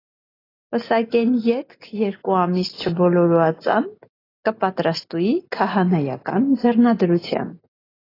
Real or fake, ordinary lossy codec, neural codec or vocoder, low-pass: real; AAC, 24 kbps; none; 5.4 kHz